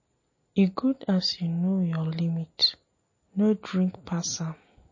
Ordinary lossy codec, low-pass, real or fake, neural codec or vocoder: MP3, 32 kbps; 7.2 kHz; real; none